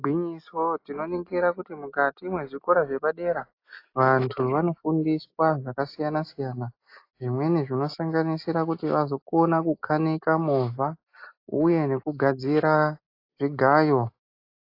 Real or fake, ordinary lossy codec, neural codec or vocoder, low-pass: real; AAC, 32 kbps; none; 5.4 kHz